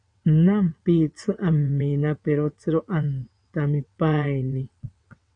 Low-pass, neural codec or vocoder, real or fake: 9.9 kHz; vocoder, 22.05 kHz, 80 mel bands, WaveNeXt; fake